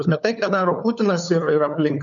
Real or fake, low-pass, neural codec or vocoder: fake; 7.2 kHz; codec, 16 kHz, 4 kbps, FunCodec, trained on LibriTTS, 50 frames a second